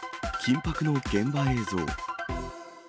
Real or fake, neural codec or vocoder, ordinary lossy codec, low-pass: real; none; none; none